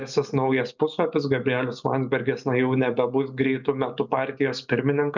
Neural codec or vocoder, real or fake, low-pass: none; real; 7.2 kHz